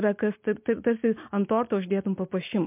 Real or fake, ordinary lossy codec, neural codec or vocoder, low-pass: real; MP3, 32 kbps; none; 3.6 kHz